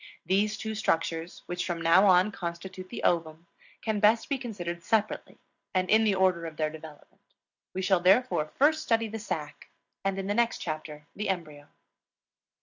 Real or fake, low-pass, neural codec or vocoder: real; 7.2 kHz; none